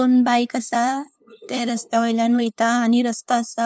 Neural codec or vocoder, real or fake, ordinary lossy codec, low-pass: codec, 16 kHz, 2 kbps, FunCodec, trained on LibriTTS, 25 frames a second; fake; none; none